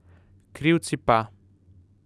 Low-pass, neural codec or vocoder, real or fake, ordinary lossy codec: none; none; real; none